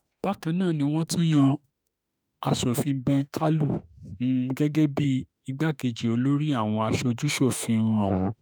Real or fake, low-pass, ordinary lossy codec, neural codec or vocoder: fake; none; none; autoencoder, 48 kHz, 32 numbers a frame, DAC-VAE, trained on Japanese speech